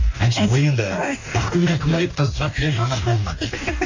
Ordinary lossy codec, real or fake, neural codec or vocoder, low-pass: AAC, 48 kbps; fake; codec, 44.1 kHz, 2.6 kbps, DAC; 7.2 kHz